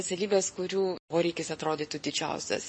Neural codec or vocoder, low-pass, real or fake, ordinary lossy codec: none; 10.8 kHz; real; MP3, 32 kbps